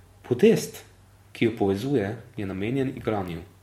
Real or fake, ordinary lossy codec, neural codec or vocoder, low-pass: fake; MP3, 64 kbps; vocoder, 44.1 kHz, 128 mel bands every 512 samples, BigVGAN v2; 19.8 kHz